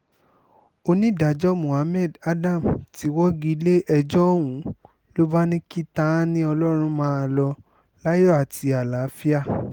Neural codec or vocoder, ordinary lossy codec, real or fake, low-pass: none; Opus, 24 kbps; real; 19.8 kHz